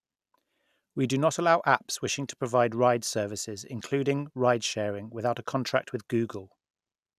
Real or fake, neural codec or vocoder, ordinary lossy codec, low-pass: real; none; none; 14.4 kHz